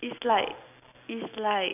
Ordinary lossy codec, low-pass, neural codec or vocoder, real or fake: none; 3.6 kHz; none; real